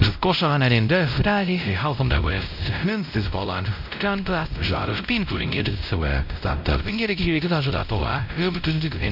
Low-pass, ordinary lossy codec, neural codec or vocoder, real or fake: 5.4 kHz; none; codec, 16 kHz, 0.5 kbps, X-Codec, WavLM features, trained on Multilingual LibriSpeech; fake